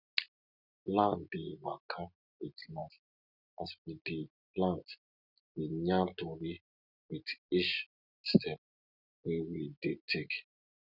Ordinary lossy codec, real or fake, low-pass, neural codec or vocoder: none; real; 5.4 kHz; none